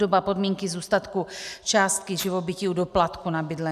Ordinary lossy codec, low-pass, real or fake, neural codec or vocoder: AAC, 96 kbps; 14.4 kHz; real; none